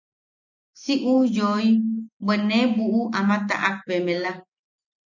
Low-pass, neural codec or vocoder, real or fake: 7.2 kHz; none; real